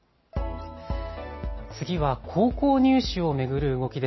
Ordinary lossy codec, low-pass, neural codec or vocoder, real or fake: MP3, 24 kbps; 7.2 kHz; none; real